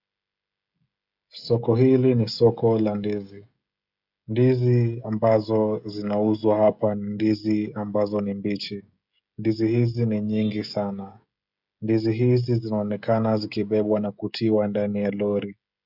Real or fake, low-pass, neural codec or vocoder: fake; 5.4 kHz; codec, 16 kHz, 16 kbps, FreqCodec, smaller model